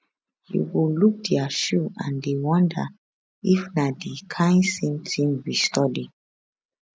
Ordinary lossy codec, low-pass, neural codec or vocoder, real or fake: none; none; none; real